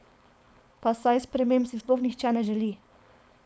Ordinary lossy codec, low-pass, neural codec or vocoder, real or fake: none; none; codec, 16 kHz, 4.8 kbps, FACodec; fake